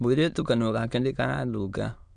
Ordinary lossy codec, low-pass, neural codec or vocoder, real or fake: none; 9.9 kHz; autoencoder, 22.05 kHz, a latent of 192 numbers a frame, VITS, trained on many speakers; fake